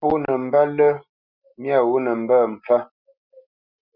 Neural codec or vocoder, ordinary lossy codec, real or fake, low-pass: none; Opus, 64 kbps; real; 5.4 kHz